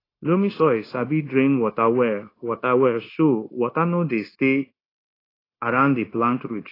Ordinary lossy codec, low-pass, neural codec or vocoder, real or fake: AAC, 24 kbps; 5.4 kHz; codec, 16 kHz, 0.9 kbps, LongCat-Audio-Codec; fake